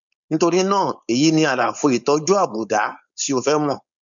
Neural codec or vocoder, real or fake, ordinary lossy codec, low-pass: codec, 16 kHz, 4.8 kbps, FACodec; fake; none; 7.2 kHz